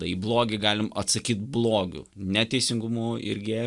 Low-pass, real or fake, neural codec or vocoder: 10.8 kHz; real; none